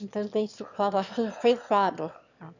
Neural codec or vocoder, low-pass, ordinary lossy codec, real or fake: autoencoder, 22.05 kHz, a latent of 192 numbers a frame, VITS, trained on one speaker; 7.2 kHz; none; fake